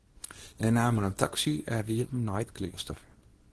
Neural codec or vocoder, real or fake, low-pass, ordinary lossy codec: codec, 24 kHz, 0.9 kbps, WavTokenizer, small release; fake; 10.8 kHz; Opus, 16 kbps